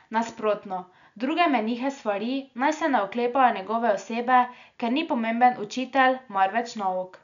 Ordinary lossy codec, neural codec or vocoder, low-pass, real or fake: none; none; 7.2 kHz; real